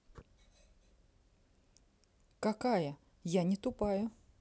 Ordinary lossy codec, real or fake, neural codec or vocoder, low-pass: none; real; none; none